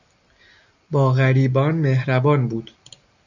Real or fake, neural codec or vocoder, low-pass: real; none; 7.2 kHz